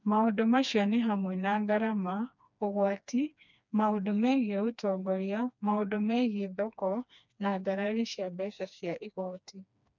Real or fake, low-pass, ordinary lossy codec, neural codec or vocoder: fake; 7.2 kHz; none; codec, 16 kHz, 2 kbps, FreqCodec, smaller model